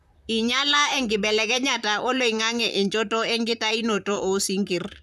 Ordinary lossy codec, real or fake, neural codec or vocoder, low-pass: none; fake; vocoder, 44.1 kHz, 128 mel bands, Pupu-Vocoder; 14.4 kHz